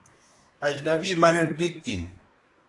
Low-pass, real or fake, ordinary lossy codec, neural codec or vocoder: 10.8 kHz; fake; AAC, 48 kbps; codec, 24 kHz, 1 kbps, SNAC